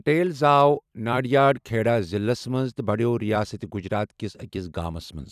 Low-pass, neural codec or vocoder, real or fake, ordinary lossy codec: 14.4 kHz; vocoder, 44.1 kHz, 128 mel bands every 256 samples, BigVGAN v2; fake; none